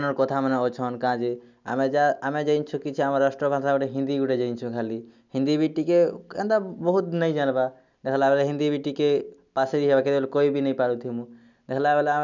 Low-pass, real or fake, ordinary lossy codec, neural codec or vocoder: 7.2 kHz; real; none; none